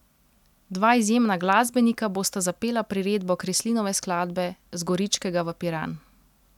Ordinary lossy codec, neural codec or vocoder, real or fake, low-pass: none; none; real; 19.8 kHz